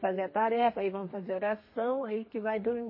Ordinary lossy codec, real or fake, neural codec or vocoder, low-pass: MP3, 24 kbps; fake; codec, 32 kHz, 1.9 kbps, SNAC; 5.4 kHz